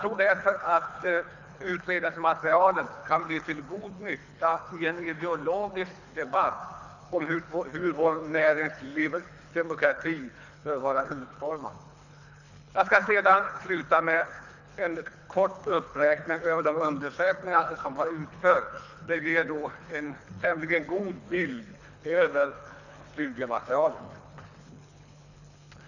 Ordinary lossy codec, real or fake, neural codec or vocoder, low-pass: none; fake; codec, 24 kHz, 3 kbps, HILCodec; 7.2 kHz